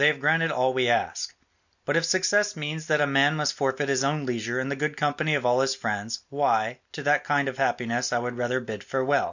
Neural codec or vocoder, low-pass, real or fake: none; 7.2 kHz; real